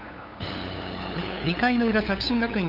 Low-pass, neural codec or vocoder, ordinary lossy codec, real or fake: 5.4 kHz; codec, 16 kHz, 8 kbps, FunCodec, trained on LibriTTS, 25 frames a second; none; fake